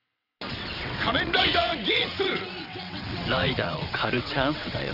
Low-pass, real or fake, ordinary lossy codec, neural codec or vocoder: 5.4 kHz; fake; none; vocoder, 22.05 kHz, 80 mel bands, WaveNeXt